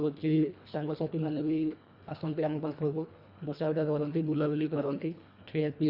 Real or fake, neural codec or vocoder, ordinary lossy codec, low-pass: fake; codec, 24 kHz, 1.5 kbps, HILCodec; Opus, 64 kbps; 5.4 kHz